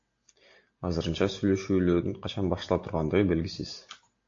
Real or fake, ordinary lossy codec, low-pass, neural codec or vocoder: real; AAC, 48 kbps; 7.2 kHz; none